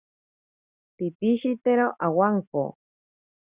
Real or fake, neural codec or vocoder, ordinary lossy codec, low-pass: real; none; Opus, 64 kbps; 3.6 kHz